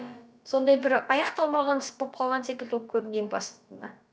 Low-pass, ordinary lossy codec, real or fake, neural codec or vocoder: none; none; fake; codec, 16 kHz, about 1 kbps, DyCAST, with the encoder's durations